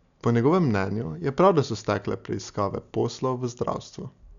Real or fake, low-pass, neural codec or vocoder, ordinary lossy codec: real; 7.2 kHz; none; none